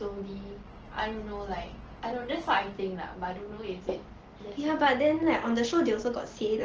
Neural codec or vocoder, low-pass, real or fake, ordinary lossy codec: none; 7.2 kHz; real; Opus, 16 kbps